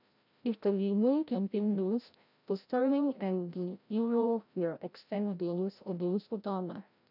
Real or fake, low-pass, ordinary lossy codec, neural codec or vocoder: fake; 5.4 kHz; none; codec, 16 kHz, 0.5 kbps, FreqCodec, larger model